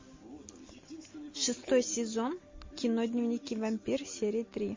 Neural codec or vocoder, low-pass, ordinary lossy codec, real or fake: none; 7.2 kHz; MP3, 32 kbps; real